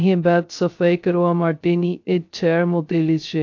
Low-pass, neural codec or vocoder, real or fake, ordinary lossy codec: 7.2 kHz; codec, 16 kHz, 0.2 kbps, FocalCodec; fake; AAC, 48 kbps